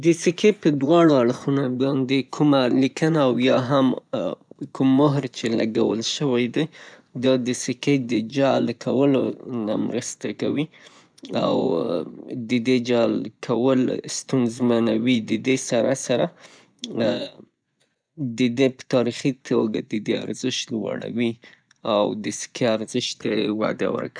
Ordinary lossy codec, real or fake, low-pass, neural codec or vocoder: none; fake; 9.9 kHz; vocoder, 24 kHz, 100 mel bands, Vocos